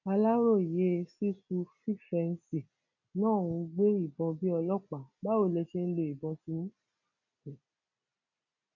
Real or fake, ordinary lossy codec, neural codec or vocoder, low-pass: real; none; none; 7.2 kHz